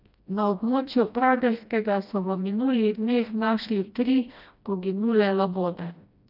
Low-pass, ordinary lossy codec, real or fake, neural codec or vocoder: 5.4 kHz; none; fake; codec, 16 kHz, 1 kbps, FreqCodec, smaller model